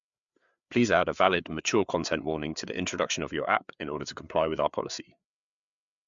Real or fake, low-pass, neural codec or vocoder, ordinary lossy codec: fake; 7.2 kHz; codec, 16 kHz, 4 kbps, FreqCodec, larger model; MP3, 64 kbps